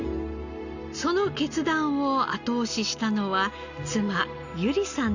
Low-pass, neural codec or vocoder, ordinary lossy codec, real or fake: 7.2 kHz; none; Opus, 64 kbps; real